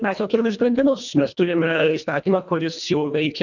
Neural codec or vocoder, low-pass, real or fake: codec, 24 kHz, 1.5 kbps, HILCodec; 7.2 kHz; fake